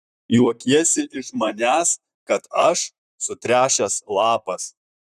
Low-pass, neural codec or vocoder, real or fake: 14.4 kHz; vocoder, 44.1 kHz, 128 mel bands, Pupu-Vocoder; fake